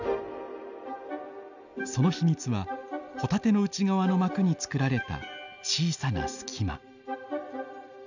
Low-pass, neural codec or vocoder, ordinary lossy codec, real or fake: 7.2 kHz; none; none; real